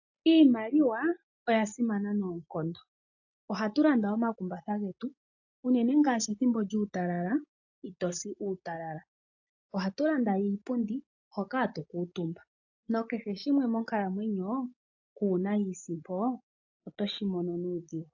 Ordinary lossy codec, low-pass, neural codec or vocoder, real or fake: AAC, 48 kbps; 7.2 kHz; none; real